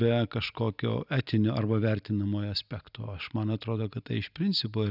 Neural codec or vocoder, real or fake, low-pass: none; real; 5.4 kHz